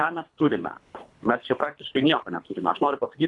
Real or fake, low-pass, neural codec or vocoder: fake; 10.8 kHz; codec, 24 kHz, 3 kbps, HILCodec